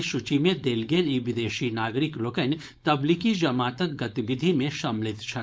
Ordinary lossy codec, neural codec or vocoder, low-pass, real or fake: none; codec, 16 kHz, 4.8 kbps, FACodec; none; fake